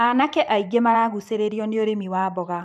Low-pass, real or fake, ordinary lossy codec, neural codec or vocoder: 14.4 kHz; fake; none; vocoder, 44.1 kHz, 128 mel bands every 512 samples, BigVGAN v2